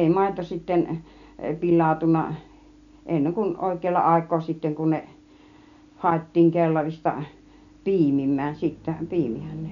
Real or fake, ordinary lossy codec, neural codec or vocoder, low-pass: real; none; none; 7.2 kHz